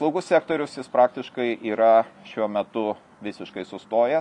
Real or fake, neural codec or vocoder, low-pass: real; none; 10.8 kHz